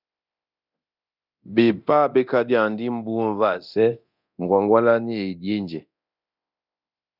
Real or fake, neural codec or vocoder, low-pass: fake; codec, 24 kHz, 0.9 kbps, DualCodec; 5.4 kHz